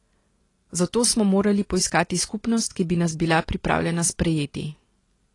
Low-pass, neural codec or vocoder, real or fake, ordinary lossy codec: 10.8 kHz; none; real; AAC, 32 kbps